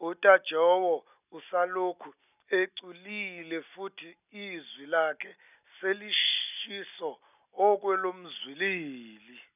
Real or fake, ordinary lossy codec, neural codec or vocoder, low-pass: real; none; none; 3.6 kHz